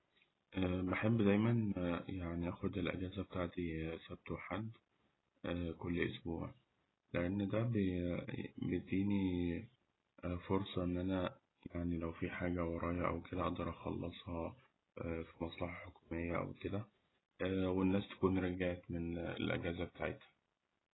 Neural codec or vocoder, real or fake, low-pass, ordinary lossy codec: none; real; 7.2 kHz; AAC, 16 kbps